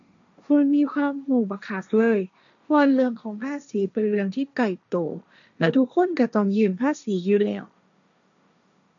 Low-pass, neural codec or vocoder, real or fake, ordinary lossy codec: 7.2 kHz; codec, 16 kHz, 1.1 kbps, Voila-Tokenizer; fake; none